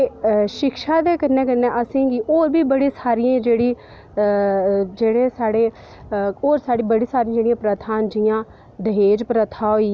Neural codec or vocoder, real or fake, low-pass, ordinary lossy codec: none; real; none; none